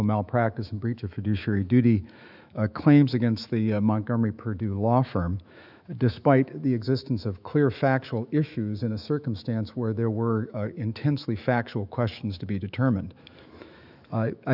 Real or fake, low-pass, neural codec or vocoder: fake; 5.4 kHz; autoencoder, 48 kHz, 128 numbers a frame, DAC-VAE, trained on Japanese speech